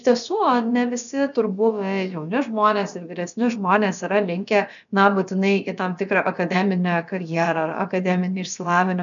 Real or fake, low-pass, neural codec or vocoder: fake; 7.2 kHz; codec, 16 kHz, about 1 kbps, DyCAST, with the encoder's durations